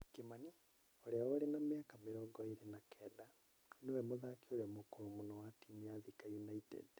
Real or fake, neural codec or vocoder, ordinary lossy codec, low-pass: real; none; none; none